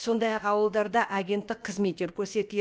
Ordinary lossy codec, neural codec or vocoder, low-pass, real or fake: none; codec, 16 kHz, about 1 kbps, DyCAST, with the encoder's durations; none; fake